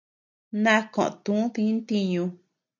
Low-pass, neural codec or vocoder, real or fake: 7.2 kHz; none; real